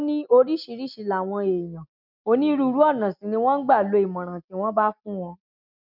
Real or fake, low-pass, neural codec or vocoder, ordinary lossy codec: real; 5.4 kHz; none; none